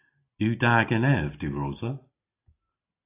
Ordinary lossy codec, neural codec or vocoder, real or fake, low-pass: AAC, 24 kbps; none; real; 3.6 kHz